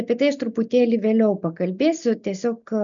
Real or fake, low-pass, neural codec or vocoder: real; 7.2 kHz; none